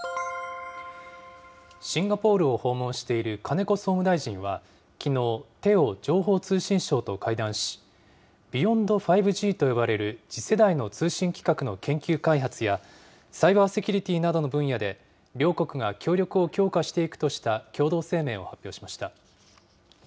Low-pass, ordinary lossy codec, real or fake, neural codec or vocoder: none; none; real; none